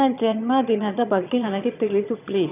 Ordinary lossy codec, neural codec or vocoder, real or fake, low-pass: none; vocoder, 22.05 kHz, 80 mel bands, HiFi-GAN; fake; 3.6 kHz